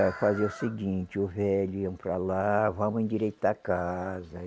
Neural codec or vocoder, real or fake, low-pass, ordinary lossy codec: none; real; none; none